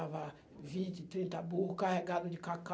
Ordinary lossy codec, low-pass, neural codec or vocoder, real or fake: none; none; none; real